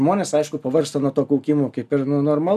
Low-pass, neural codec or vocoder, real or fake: 14.4 kHz; none; real